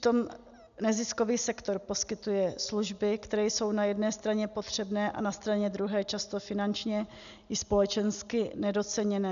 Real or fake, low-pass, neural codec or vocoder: real; 7.2 kHz; none